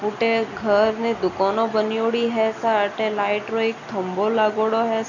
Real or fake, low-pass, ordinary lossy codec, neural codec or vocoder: real; 7.2 kHz; none; none